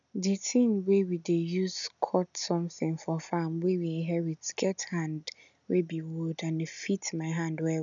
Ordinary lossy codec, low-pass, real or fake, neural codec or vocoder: none; 7.2 kHz; real; none